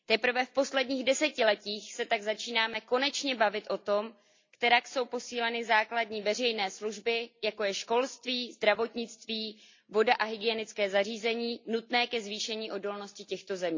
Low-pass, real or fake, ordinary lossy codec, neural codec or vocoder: 7.2 kHz; real; MP3, 64 kbps; none